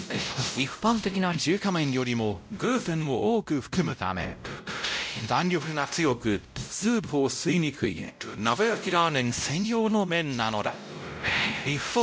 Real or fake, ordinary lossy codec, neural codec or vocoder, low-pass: fake; none; codec, 16 kHz, 0.5 kbps, X-Codec, WavLM features, trained on Multilingual LibriSpeech; none